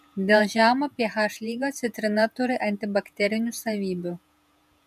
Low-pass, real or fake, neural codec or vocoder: 14.4 kHz; fake; vocoder, 48 kHz, 128 mel bands, Vocos